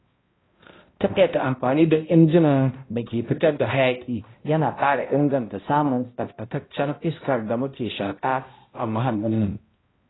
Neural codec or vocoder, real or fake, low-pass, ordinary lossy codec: codec, 16 kHz, 0.5 kbps, X-Codec, HuBERT features, trained on balanced general audio; fake; 7.2 kHz; AAC, 16 kbps